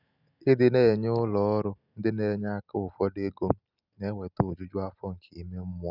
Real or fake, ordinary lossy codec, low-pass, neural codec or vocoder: real; none; 5.4 kHz; none